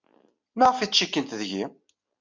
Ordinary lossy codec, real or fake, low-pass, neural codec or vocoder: MP3, 64 kbps; real; 7.2 kHz; none